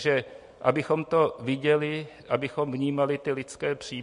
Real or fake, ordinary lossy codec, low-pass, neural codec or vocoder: real; MP3, 48 kbps; 10.8 kHz; none